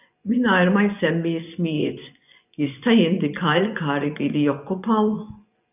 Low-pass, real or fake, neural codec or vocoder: 3.6 kHz; fake; vocoder, 24 kHz, 100 mel bands, Vocos